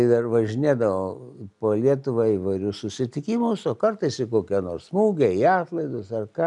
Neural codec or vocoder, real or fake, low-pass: none; real; 10.8 kHz